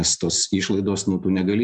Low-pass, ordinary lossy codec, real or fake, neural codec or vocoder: 9.9 kHz; MP3, 96 kbps; real; none